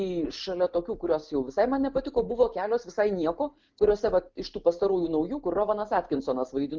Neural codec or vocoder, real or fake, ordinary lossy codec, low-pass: none; real; Opus, 32 kbps; 7.2 kHz